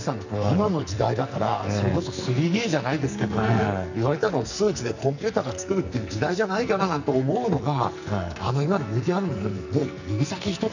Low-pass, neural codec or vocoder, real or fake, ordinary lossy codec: 7.2 kHz; codec, 44.1 kHz, 2.6 kbps, SNAC; fake; none